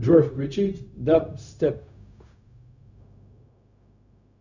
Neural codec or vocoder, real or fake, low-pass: codec, 16 kHz, 0.4 kbps, LongCat-Audio-Codec; fake; 7.2 kHz